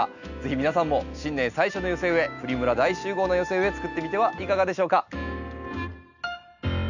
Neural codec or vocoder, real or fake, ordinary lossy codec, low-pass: none; real; none; 7.2 kHz